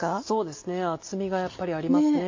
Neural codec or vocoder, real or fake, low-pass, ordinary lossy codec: none; real; 7.2 kHz; MP3, 48 kbps